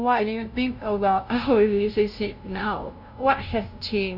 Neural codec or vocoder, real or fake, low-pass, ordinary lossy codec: codec, 16 kHz, 0.5 kbps, FunCodec, trained on LibriTTS, 25 frames a second; fake; 5.4 kHz; none